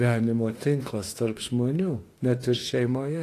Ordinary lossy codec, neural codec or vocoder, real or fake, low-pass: AAC, 48 kbps; autoencoder, 48 kHz, 32 numbers a frame, DAC-VAE, trained on Japanese speech; fake; 14.4 kHz